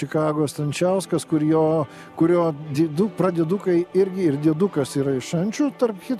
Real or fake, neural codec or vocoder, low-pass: real; none; 14.4 kHz